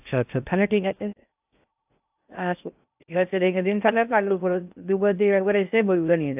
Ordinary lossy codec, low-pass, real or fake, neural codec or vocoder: AAC, 32 kbps; 3.6 kHz; fake; codec, 16 kHz in and 24 kHz out, 0.6 kbps, FocalCodec, streaming, 2048 codes